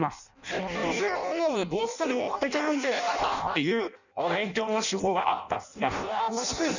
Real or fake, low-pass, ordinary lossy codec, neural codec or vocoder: fake; 7.2 kHz; none; codec, 16 kHz in and 24 kHz out, 0.6 kbps, FireRedTTS-2 codec